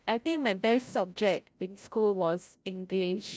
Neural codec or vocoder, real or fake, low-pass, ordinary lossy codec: codec, 16 kHz, 0.5 kbps, FreqCodec, larger model; fake; none; none